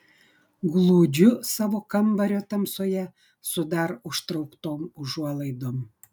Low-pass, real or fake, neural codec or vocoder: 19.8 kHz; real; none